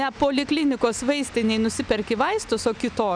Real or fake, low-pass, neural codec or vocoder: real; 9.9 kHz; none